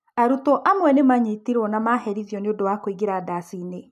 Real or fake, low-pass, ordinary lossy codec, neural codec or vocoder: real; 14.4 kHz; none; none